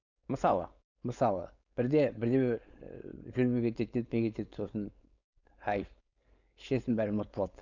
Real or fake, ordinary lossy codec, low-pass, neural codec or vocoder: fake; none; 7.2 kHz; codec, 16 kHz, 4.8 kbps, FACodec